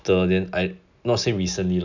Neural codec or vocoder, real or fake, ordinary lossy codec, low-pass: none; real; none; 7.2 kHz